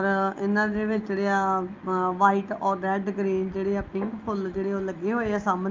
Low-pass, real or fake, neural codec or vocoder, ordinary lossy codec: 7.2 kHz; real; none; Opus, 24 kbps